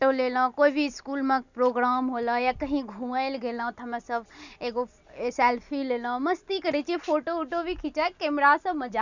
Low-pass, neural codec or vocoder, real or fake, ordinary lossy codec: 7.2 kHz; none; real; none